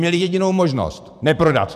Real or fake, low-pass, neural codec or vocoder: fake; 14.4 kHz; vocoder, 44.1 kHz, 128 mel bands every 512 samples, BigVGAN v2